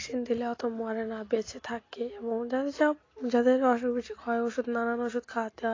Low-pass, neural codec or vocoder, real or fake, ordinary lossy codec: 7.2 kHz; none; real; AAC, 32 kbps